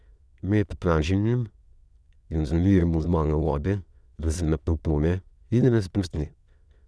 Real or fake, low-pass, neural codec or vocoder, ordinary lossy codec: fake; none; autoencoder, 22.05 kHz, a latent of 192 numbers a frame, VITS, trained on many speakers; none